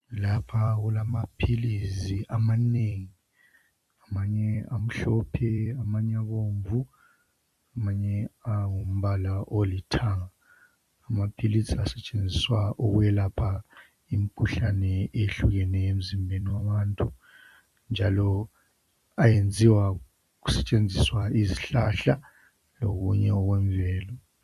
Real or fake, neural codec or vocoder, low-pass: fake; vocoder, 44.1 kHz, 128 mel bands every 256 samples, BigVGAN v2; 14.4 kHz